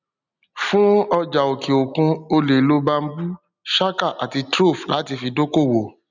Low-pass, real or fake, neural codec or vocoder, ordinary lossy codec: 7.2 kHz; real; none; none